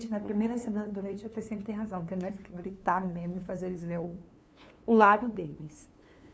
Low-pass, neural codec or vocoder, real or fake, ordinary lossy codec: none; codec, 16 kHz, 2 kbps, FunCodec, trained on LibriTTS, 25 frames a second; fake; none